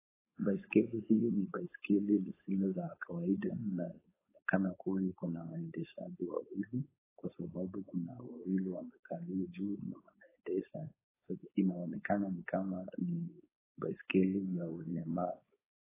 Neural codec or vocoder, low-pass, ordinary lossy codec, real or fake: codec, 16 kHz, 4.8 kbps, FACodec; 3.6 kHz; AAC, 16 kbps; fake